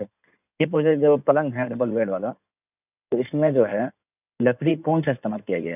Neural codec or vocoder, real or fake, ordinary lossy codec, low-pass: codec, 16 kHz in and 24 kHz out, 2.2 kbps, FireRedTTS-2 codec; fake; none; 3.6 kHz